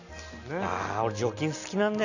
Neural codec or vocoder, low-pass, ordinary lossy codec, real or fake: none; 7.2 kHz; none; real